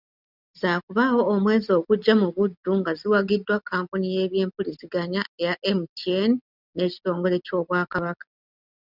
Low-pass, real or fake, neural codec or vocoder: 5.4 kHz; real; none